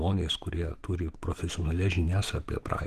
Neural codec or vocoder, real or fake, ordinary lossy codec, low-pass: autoencoder, 48 kHz, 128 numbers a frame, DAC-VAE, trained on Japanese speech; fake; Opus, 16 kbps; 14.4 kHz